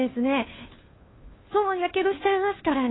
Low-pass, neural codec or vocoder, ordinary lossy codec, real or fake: 7.2 kHz; codec, 16 kHz, 2 kbps, FunCodec, trained on Chinese and English, 25 frames a second; AAC, 16 kbps; fake